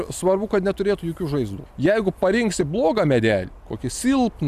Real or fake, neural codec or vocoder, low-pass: real; none; 14.4 kHz